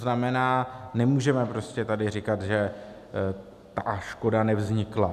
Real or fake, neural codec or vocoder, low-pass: real; none; 14.4 kHz